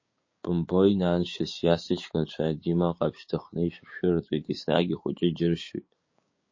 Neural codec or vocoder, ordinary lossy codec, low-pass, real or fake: codec, 16 kHz, 6 kbps, DAC; MP3, 32 kbps; 7.2 kHz; fake